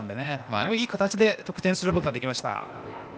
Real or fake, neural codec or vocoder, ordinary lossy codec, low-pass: fake; codec, 16 kHz, 0.8 kbps, ZipCodec; none; none